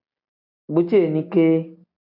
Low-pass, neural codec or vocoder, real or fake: 5.4 kHz; none; real